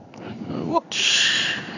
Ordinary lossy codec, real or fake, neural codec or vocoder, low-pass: none; fake; codec, 16 kHz in and 24 kHz out, 1 kbps, XY-Tokenizer; 7.2 kHz